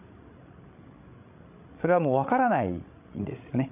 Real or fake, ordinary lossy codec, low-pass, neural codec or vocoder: fake; none; 3.6 kHz; codec, 16 kHz, 16 kbps, FreqCodec, larger model